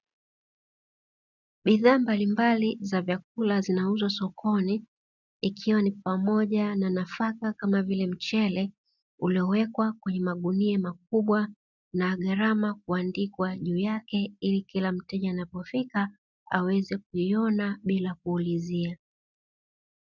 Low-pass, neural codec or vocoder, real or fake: 7.2 kHz; none; real